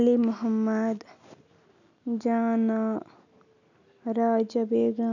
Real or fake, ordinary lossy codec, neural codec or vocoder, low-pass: real; none; none; 7.2 kHz